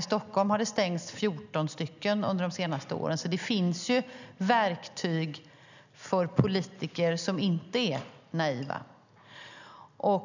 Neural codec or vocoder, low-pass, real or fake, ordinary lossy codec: none; 7.2 kHz; real; none